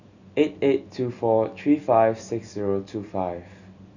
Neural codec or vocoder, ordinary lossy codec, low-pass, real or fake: none; none; 7.2 kHz; real